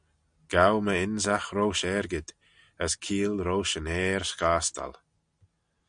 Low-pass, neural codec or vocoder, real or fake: 9.9 kHz; none; real